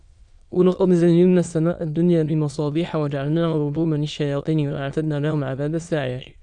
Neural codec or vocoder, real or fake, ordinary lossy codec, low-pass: autoencoder, 22.05 kHz, a latent of 192 numbers a frame, VITS, trained on many speakers; fake; Opus, 64 kbps; 9.9 kHz